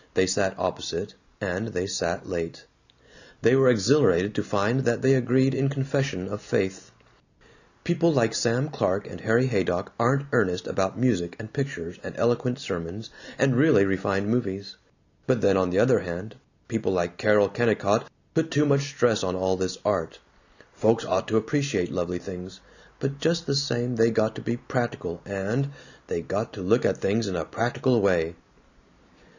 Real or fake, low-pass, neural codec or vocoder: real; 7.2 kHz; none